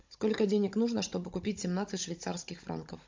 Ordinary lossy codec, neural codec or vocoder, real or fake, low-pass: MP3, 48 kbps; codec, 16 kHz, 16 kbps, FunCodec, trained on Chinese and English, 50 frames a second; fake; 7.2 kHz